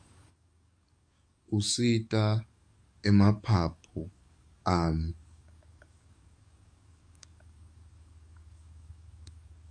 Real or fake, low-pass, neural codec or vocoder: fake; 9.9 kHz; codec, 44.1 kHz, 7.8 kbps, DAC